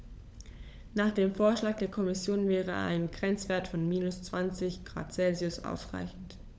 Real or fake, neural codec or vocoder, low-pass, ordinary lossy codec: fake; codec, 16 kHz, 16 kbps, FunCodec, trained on LibriTTS, 50 frames a second; none; none